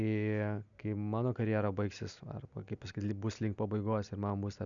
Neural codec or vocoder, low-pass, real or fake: none; 7.2 kHz; real